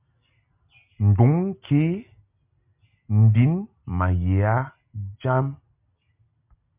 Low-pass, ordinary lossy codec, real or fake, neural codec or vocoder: 3.6 kHz; MP3, 32 kbps; real; none